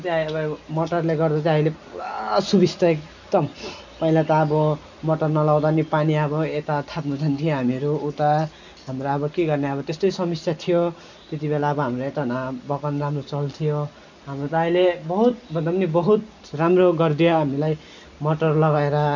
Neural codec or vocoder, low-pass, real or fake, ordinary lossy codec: none; 7.2 kHz; real; none